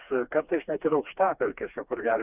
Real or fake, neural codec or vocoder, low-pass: fake; codec, 16 kHz, 2 kbps, FreqCodec, smaller model; 3.6 kHz